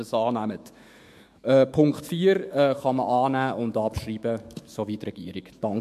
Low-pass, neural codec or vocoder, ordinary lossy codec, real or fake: 14.4 kHz; autoencoder, 48 kHz, 128 numbers a frame, DAC-VAE, trained on Japanese speech; MP3, 64 kbps; fake